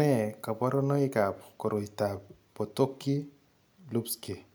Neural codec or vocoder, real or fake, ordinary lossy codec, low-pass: none; real; none; none